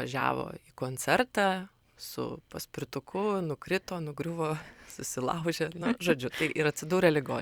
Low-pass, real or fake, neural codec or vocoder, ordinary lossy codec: 19.8 kHz; fake; vocoder, 44.1 kHz, 128 mel bands every 256 samples, BigVGAN v2; Opus, 64 kbps